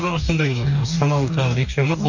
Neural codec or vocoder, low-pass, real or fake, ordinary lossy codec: codec, 44.1 kHz, 2.6 kbps, DAC; 7.2 kHz; fake; none